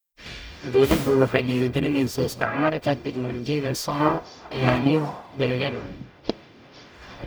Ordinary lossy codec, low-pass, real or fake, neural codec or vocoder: none; none; fake; codec, 44.1 kHz, 0.9 kbps, DAC